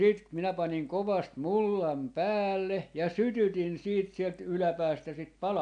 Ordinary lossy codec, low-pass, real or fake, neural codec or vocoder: AAC, 64 kbps; 9.9 kHz; real; none